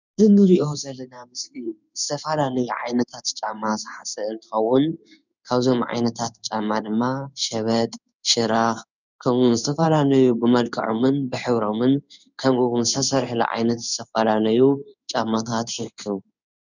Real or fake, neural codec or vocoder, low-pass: fake; codec, 16 kHz in and 24 kHz out, 1 kbps, XY-Tokenizer; 7.2 kHz